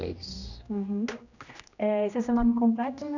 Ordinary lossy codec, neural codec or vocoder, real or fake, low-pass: none; codec, 16 kHz, 1 kbps, X-Codec, HuBERT features, trained on general audio; fake; 7.2 kHz